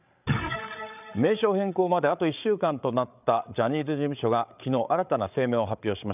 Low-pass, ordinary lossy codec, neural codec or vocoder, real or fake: 3.6 kHz; none; codec, 16 kHz, 8 kbps, FreqCodec, larger model; fake